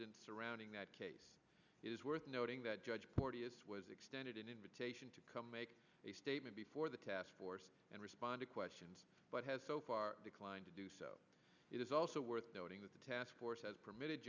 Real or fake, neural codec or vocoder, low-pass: real; none; 7.2 kHz